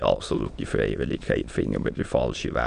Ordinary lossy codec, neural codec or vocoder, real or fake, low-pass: none; autoencoder, 22.05 kHz, a latent of 192 numbers a frame, VITS, trained on many speakers; fake; 9.9 kHz